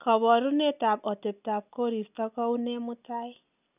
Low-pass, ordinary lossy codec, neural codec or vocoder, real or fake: 3.6 kHz; none; none; real